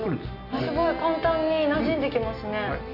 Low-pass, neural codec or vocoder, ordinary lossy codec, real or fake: 5.4 kHz; none; none; real